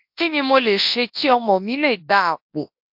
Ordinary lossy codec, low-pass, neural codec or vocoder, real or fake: MP3, 48 kbps; 5.4 kHz; codec, 24 kHz, 0.9 kbps, WavTokenizer, large speech release; fake